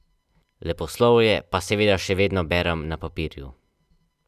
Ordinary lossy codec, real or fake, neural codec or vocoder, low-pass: none; real; none; 14.4 kHz